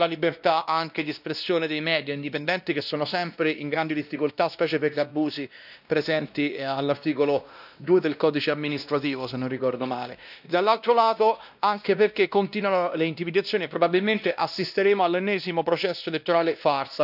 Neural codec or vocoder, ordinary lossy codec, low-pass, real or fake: codec, 16 kHz, 1 kbps, X-Codec, WavLM features, trained on Multilingual LibriSpeech; none; 5.4 kHz; fake